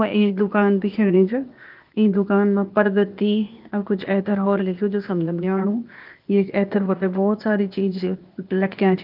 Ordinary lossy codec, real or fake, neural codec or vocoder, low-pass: Opus, 32 kbps; fake; codec, 16 kHz, 0.8 kbps, ZipCodec; 5.4 kHz